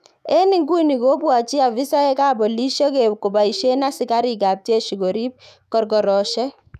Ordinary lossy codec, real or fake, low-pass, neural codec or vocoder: none; fake; 14.4 kHz; autoencoder, 48 kHz, 128 numbers a frame, DAC-VAE, trained on Japanese speech